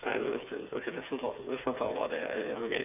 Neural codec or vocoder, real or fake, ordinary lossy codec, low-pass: codec, 16 kHz, 4 kbps, FreqCodec, smaller model; fake; none; 3.6 kHz